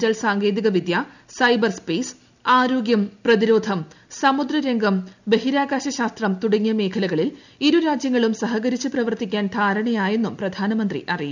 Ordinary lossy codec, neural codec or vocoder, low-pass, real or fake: none; none; 7.2 kHz; real